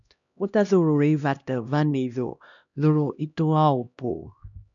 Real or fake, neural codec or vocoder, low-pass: fake; codec, 16 kHz, 1 kbps, X-Codec, HuBERT features, trained on LibriSpeech; 7.2 kHz